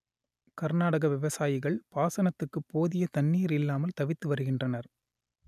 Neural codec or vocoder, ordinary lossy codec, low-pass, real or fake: none; none; 14.4 kHz; real